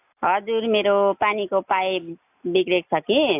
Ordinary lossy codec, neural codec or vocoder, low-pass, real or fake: AAC, 32 kbps; none; 3.6 kHz; real